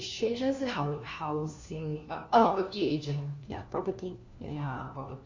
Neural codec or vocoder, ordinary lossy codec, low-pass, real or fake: codec, 16 kHz, 1 kbps, FunCodec, trained on LibriTTS, 50 frames a second; MP3, 48 kbps; 7.2 kHz; fake